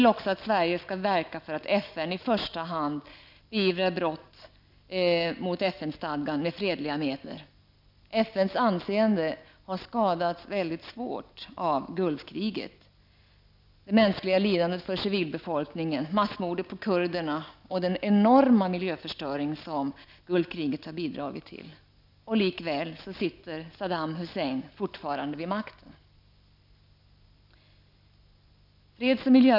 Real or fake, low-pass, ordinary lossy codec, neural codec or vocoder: real; 5.4 kHz; none; none